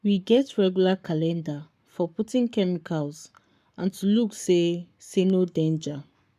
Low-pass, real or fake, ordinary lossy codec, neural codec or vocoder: 14.4 kHz; fake; none; codec, 44.1 kHz, 7.8 kbps, Pupu-Codec